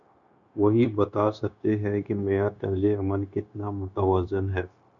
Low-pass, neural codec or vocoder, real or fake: 7.2 kHz; codec, 16 kHz, 0.9 kbps, LongCat-Audio-Codec; fake